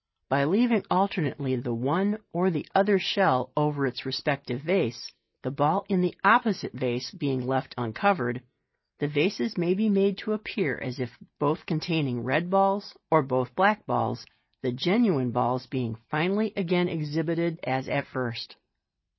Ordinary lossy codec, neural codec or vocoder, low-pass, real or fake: MP3, 24 kbps; none; 7.2 kHz; real